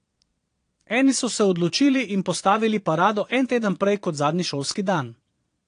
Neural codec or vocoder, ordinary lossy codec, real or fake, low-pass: vocoder, 22.05 kHz, 80 mel bands, Vocos; AAC, 48 kbps; fake; 9.9 kHz